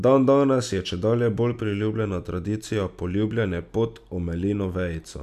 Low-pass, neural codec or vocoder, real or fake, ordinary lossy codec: 14.4 kHz; none; real; none